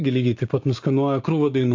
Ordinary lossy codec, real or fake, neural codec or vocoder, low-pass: AAC, 48 kbps; fake; codec, 16 kHz, 6 kbps, DAC; 7.2 kHz